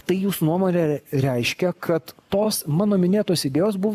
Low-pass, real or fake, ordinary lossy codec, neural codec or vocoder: 14.4 kHz; fake; AAC, 96 kbps; codec, 44.1 kHz, 7.8 kbps, Pupu-Codec